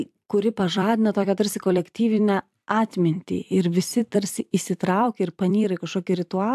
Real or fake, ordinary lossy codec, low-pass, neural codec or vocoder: fake; AAC, 96 kbps; 14.4 kHz; vocoder, 44.1 kHz, 128 mel bands every 256 samples, BigVGAN v2